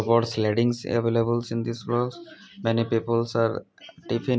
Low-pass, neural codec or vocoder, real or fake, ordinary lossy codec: none; none; real; none